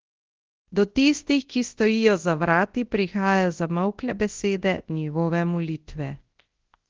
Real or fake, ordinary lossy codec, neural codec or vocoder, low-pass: fake; Opus, 16 kbps; codec, 24 kHz, 0.9 kbps, WavTokenizer, large speech release; 7.2 kHz